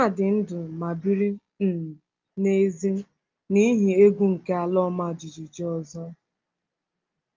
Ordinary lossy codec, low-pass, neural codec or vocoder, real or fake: Opus, 32 kbps; 7.2 kHz; none; real